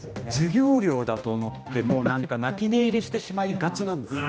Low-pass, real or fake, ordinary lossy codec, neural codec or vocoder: none; fake; none; codec, 16 kHz, 1 kbps, X-Codec, HuBERT features, trained on general audio